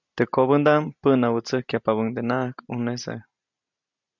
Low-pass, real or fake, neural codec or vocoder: 7.2 kHz; real; none